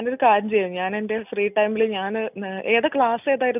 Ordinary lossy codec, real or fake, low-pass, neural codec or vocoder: none; real; 3.6 kHz; none